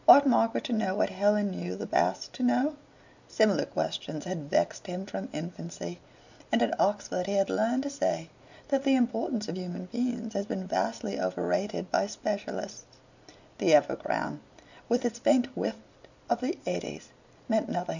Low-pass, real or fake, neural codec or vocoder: 7.2 kHz; real; none